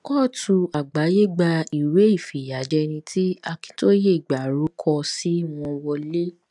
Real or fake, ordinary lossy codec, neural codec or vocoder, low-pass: real; none; none; 10.8 kHz